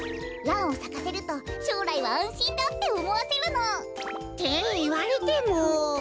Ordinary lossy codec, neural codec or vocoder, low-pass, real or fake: none; none; none; real